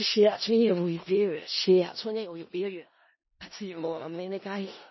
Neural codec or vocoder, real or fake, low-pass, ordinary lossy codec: codec, 16 kHz in and 24 kHz out, 0.4 kbps, LongCat-Audio-Codec, four codebook decoder; fake; 7.2 kHz; MP3, 24 kbps